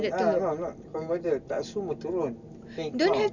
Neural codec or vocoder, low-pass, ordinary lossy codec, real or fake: none; 7.2 kHz; none; real